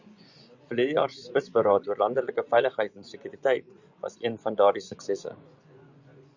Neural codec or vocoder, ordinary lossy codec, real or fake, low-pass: vocoder, 24 kHz, 100 mel bands, Vocos; Opus, 64 kbps; fake; 7.2 kHz